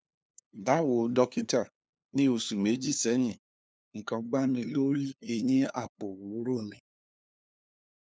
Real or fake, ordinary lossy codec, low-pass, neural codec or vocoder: fake; none; none; codec, 16 kHz, 2 kbps, FunCodec, trained on LibriTTS, 25 frames a second